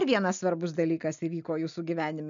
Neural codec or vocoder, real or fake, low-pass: none; real; 7.2 kHz